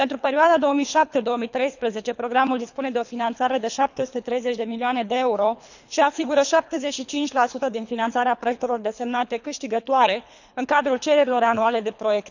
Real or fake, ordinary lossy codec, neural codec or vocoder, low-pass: fake; none; codec, 24 kHz, 3 kbps, HILCodec; 7.2 kHz